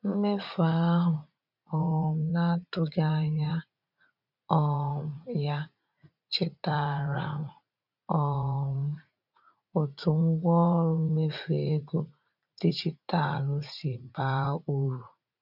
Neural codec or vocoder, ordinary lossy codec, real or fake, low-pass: vocoder, 44.1 kHz, 80 mel bands, Vocos; none; fake; 5.4 kHz